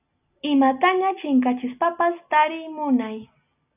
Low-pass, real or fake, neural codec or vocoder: 3.6 kHz; real; none